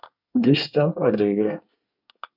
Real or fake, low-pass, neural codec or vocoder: fake; 5.4 kHz; codec, 24 kHz, 1 kbps, SNAC